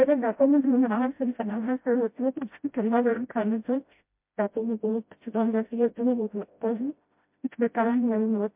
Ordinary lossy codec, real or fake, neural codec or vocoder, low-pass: MP3, 32 kbps; fake; codec, 16 kHz, 0.5 kbps, FreqCodec, smaller model; 3.6 kHz